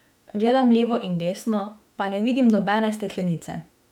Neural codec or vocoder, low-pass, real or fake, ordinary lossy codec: autoencoder, 48 kHz, 32 numbers a frame, DAC-VAE, trained on Japanese speech; 19.8 kHz; fake; none